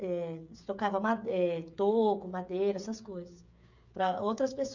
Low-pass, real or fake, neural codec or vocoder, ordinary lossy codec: 7.2 kHz; fake; codec, 16 kHz, 8 kbps, FreqCodec, smaller model; none